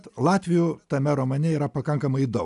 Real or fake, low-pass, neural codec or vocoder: real; 10.8 kHz; none